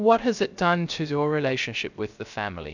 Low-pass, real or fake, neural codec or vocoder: 7.2 kHz; fake; codec, 16 kHz, 0.3 kbps, FocalCodec